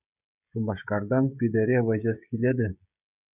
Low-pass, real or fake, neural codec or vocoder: 3.6 kHz; fake; codec, 16 kHz, 16 kbps, FreqCodec, smaller model